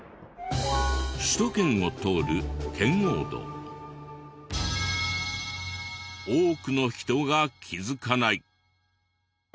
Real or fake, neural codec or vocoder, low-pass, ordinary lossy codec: real; none; none; none